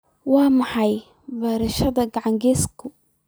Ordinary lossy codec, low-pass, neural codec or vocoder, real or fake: none; none; vocoder, 44.1 kHz, 128 mel bands every 256 samples, BigVGAN v2; fake